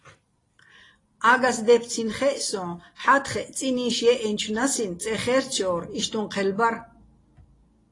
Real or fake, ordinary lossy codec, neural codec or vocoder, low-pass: real; AAC, 32 kbps; none; 10.8 kHz